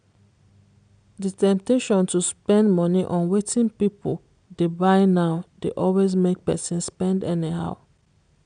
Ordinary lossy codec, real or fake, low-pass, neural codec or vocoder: MP3, 96 kbps; real; 9.9 kHz; none